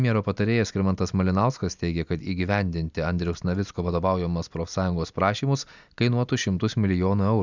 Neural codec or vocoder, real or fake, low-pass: none; real; 7.2 kHz